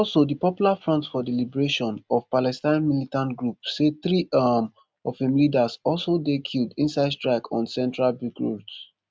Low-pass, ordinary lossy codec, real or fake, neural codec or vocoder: none; none; real; none